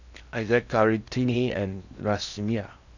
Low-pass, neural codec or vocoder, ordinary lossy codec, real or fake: 7.2 kHz; codec, 16 kHz in and 24 kHz out, 0.8 kbps, FocalCodec, streaming, 65536 codes; none; fake